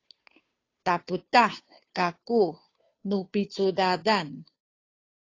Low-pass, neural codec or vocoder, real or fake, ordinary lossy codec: 7.2 kHz; codec, 16 kHz, 2 kbps, FunCodec, trained on Chinese and English, 25 frames a second; fake; AAC, 32 kbps